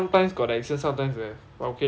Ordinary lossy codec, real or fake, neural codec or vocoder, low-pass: none; real; none; none